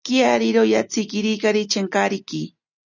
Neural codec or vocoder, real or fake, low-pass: none; real; 7.2 kHz